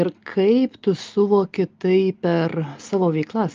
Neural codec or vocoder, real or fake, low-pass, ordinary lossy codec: none; real; 7.2 kHz; Opus, 24 kbps